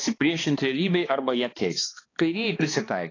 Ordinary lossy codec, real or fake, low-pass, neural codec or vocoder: AAC, 32 kbps; fake; 7.2 kHz; codec, 16 kHz, 2 kbps, X-Codec, HuBERT features, trained on balanced general audio